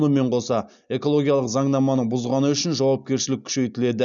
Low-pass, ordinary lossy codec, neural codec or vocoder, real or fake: 7.2 kHz; none; none; real